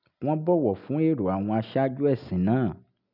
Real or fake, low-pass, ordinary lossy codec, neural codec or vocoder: real; 5.4 kHz; none; none